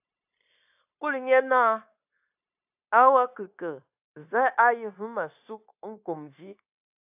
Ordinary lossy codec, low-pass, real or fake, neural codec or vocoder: AAC, 32 kbps; 3.6 kHz; fake; codec, 16 kHz, 0.9 kbps, LongCat-Audio-Codec